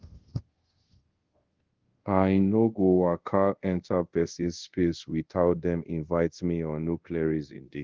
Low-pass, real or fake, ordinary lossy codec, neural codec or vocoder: 7.2 kHz; fake; Opus, 16 kbps; codec, 24 kHz, 0.5 kbps, DualCodec